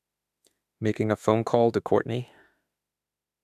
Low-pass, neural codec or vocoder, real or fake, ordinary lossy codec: 14.4 kHz; autoencoder, 48 kHz, 32 numbers a frame, DAC-VAE, trained on Japanese speech; fake; none